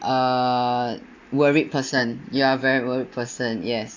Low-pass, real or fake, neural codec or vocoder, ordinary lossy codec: 7.2 kHz; real; none; AAC, 48 kbps